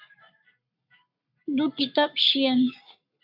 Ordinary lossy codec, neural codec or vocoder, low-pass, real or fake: MP3, 48 kbps; codec, 44.1 kHz, 7.8 kbps, Pupu-Codec; 5.4 kHz; fake